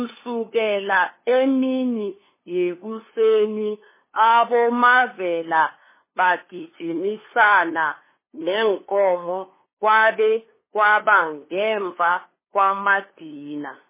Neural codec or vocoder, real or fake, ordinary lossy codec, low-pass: codec, 16 kHz, 2 kbps, FunCodec, trained on LibriTTS, 25 frames a second; fake; MP3, 16 kbps; 3.6 kHz